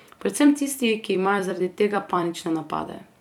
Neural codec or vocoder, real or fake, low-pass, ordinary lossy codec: vocoder, 44.1 kHz, 128 mel bands every 256 samples, BigVGAN v2; fake; 19.8 kHz; none